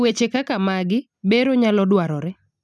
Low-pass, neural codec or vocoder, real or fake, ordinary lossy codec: none; none; real; none